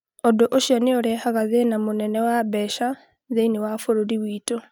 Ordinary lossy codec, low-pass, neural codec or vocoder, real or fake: none; none; none; real